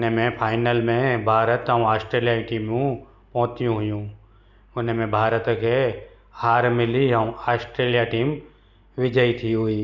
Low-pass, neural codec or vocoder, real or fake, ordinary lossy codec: 7.2 kHz; none; real; none